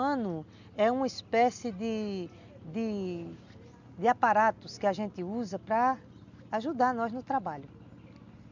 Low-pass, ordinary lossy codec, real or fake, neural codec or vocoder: 7.2 kHz; none; real; none